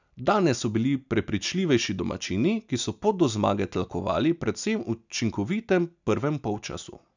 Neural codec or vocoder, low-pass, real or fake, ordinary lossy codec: none; 7.2 kHz; real; none